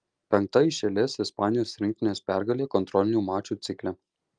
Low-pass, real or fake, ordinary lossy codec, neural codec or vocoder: 9.9 kHz; real; Opus, 32 kbps; none